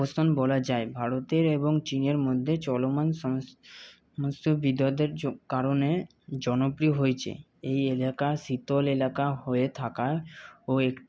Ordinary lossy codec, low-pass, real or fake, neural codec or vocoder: none; none; real; none